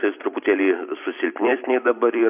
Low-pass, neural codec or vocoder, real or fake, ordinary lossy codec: 3.6 kHz; vocoder, 44.1 kHz, 128 mel bands every 512 samples, BigVGAN v2; fake; AAC, 32 kbps